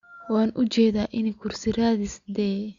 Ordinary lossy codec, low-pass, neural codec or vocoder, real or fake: Opus, 64 kbps; 7.2 kHz; none; real